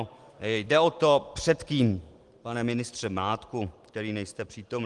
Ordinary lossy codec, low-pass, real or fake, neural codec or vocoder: Opus, 24 kbps; 10.8 kHz; real; none